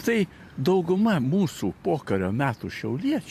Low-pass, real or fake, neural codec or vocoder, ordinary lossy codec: 14.4 kHz; fake; vocoder, 44.1 kHz, 128 mel bands every 512 samples, BigVGAN v2; MP3, 64 kbps